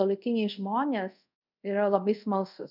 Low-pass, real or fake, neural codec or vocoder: 5.4 kHz; fake; codec, 24 kHz, 0.5 kbps, DualCodec